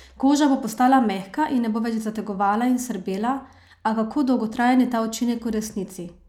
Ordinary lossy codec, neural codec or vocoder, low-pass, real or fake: none; none; 19.8 kHz; real